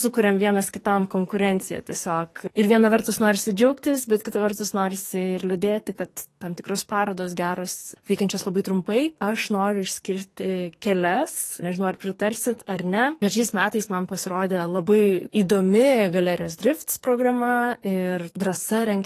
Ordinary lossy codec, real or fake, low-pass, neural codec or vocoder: AAC, 48 kbps; fake; 14.4 kHz; codec, 44.1 kHz, 2.6 kbps, SNAC